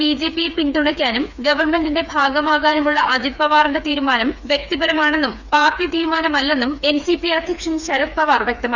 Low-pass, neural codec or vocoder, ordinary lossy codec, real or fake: 7.2 kHz; codec, 16 kHz, 4 kbps, FreqCodec, smaller model; none; fake